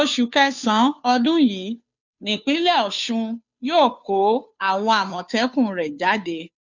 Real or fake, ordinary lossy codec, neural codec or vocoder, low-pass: fake; none; codec, 16 kHz, 2 kbps, FunCodec, trained on Chinese and English, 25 frames a second; 7.2 kHz